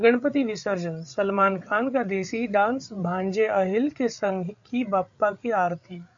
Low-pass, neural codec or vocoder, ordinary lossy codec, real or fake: 7.2 kHz; codec, 16 kHz, 8 kbps, FreqCodec, larger model; AAC, 64 kbps; fake